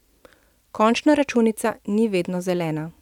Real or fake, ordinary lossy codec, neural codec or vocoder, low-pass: real; none; none; 19.8 kHz